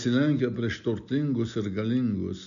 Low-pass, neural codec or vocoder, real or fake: 7.2 kHz; none; real